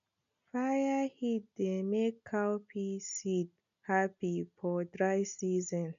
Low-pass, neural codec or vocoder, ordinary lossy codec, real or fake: 7.2 kHz; none; none; real